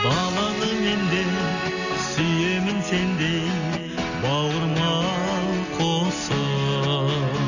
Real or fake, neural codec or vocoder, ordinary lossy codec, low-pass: real; none; none; 7.2 kHz